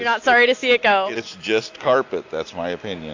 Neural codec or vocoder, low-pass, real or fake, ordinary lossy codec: none; 7.2 kHz; real; AAC, 48 kbps